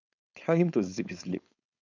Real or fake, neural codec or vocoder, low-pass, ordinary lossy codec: fake; codec, 16 kHz, 4.8 kbps, FACodec; 7.2 kHz; none